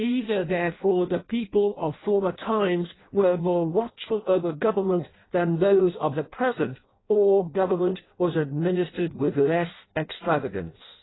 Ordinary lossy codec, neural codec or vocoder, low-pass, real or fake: AAC, 16 kbps; codec, 16 kHz in and 24 kHz out, 0.6 kbps, FireRedTTS-2 codec; 7.2 kHz; fake